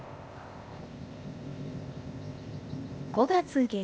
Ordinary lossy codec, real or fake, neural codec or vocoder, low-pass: none; fake; codec, 16 kHz, 0.8 kbps, ZipCodec; none